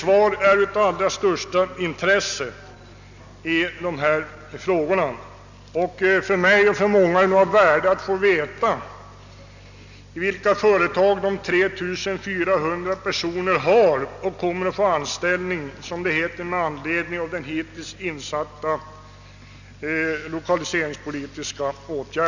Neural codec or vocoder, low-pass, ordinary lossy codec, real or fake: none; 7.2 kHz; none; real